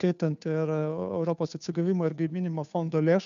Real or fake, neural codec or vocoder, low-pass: fake; codec, 16 kHz, 2 kbps, FunCodec, trained on Chinese and English, 25 frames a second; 7.2 kHz